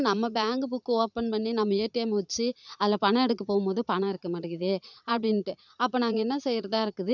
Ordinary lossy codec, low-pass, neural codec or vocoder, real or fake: none; 7.2 kHz; vocoder, 44.1 kHz, 80 mel bands, Vocos; fake